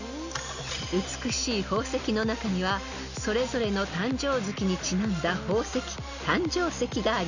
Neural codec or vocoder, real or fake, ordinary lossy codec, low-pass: none; real; none; 7.2 kHz